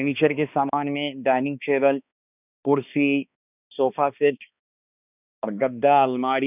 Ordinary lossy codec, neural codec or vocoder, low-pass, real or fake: none; codec, 16 kHz, 2 kbps, X-Codec, HuBERT features, trained on balanced general audio; 3.6 kHz; fake